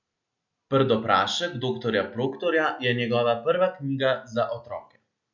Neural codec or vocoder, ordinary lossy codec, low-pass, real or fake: none; none; 7.2 kHz; real